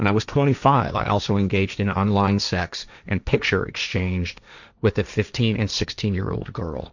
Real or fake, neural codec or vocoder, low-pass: fake; codec, 16 kHz, 1.1 kbps, Voila-Tokenizer; 7.2 kHz